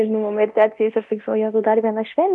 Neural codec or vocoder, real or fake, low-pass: codec, 24 kHz, 0.9 kbps, DualCodec; fake; 10.8 kHz